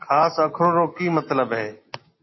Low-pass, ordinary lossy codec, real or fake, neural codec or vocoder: 7.2 kHz; MP3, 24 kbps; real; none